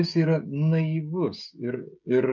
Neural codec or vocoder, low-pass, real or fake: codec, 16 kHz, 16 kbps, FreqCodec, smaller model; 7.2 kHz; fake